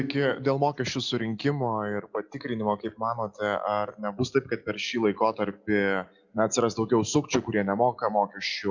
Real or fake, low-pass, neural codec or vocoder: real; 7.2 kHz; none